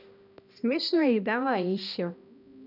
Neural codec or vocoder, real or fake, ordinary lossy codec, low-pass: codec, 16 kHz, 1 kbps, X-Codec, HuBERT features, trained on balanced general audio; fake; none; 5.4 kHz